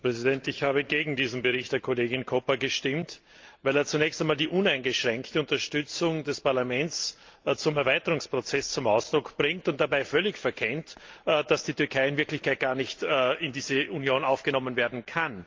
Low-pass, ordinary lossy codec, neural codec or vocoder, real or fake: 7.2 kHz; Opus, 24 kbps; none; real